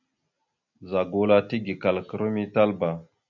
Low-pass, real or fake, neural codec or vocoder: 7.2 kHz; real; none